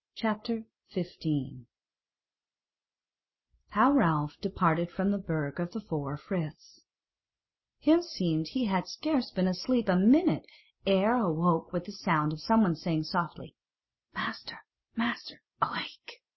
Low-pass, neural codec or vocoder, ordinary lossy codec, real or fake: 7.2 kHz; none; MP3, 24 kbps; real